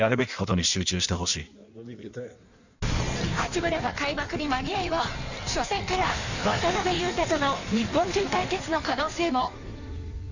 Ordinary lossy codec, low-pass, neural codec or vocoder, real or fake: none; 7.2 kHz; codec, 16 kHz in and 24 kHz out, 1.1 kbps, FireRedTTS-2 codec; fake